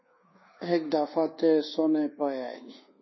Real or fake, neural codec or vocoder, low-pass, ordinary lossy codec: fake; codec, 24 kHz, 1.2 kbps, DualCodec; 7.2 kHz; MP3, 24 kbps